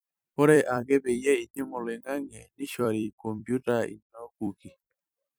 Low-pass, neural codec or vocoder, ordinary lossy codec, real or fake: none; vocoder, 44.1 kHz, 128 mel bands every 256 samples, BigVGAN v2; none; fake